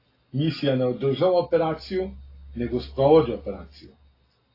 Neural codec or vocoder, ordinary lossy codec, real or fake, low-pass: none; AAC, 24 kbps; real; 5.4 kHz